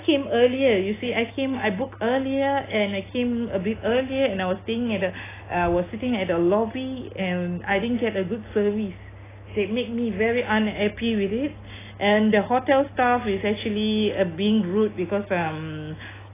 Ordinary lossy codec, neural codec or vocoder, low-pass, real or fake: AAC, 16 kbps; none; 3.6 kHz; real